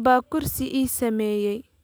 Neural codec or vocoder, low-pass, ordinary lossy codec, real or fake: none; none; none; real